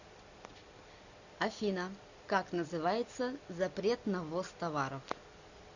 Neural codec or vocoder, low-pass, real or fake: none; 7.2 kHz; real